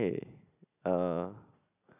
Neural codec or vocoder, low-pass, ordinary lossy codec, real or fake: none; 3.6 kHz; none; real